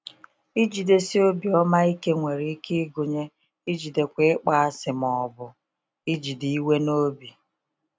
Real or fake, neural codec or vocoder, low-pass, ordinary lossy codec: real; none; none; none